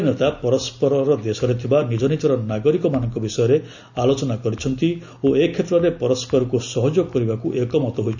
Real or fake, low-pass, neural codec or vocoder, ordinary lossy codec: real; 7.2 kHz; none; none